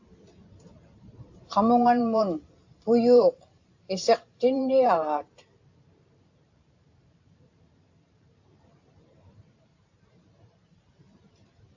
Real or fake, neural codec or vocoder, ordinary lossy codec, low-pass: fake; vocoder, 44.1 kHz, 128 mel bands every 512 samples, BigVGAN v2; AAC, 48 kbps; 7.2 kHz